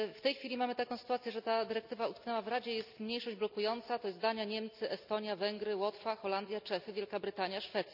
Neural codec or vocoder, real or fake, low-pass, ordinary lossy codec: none; real; 5.4 kHz; none